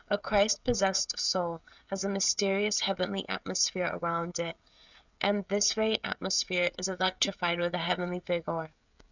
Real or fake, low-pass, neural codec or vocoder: fake; 7.2 kHz; codec, 16 kHz, 16 kbps, FreqCodec, smaller model